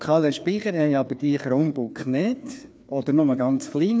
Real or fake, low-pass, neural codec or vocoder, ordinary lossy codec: fake; none; codec, 16 kHz, 2 kbps, FreqCodec, larger model; none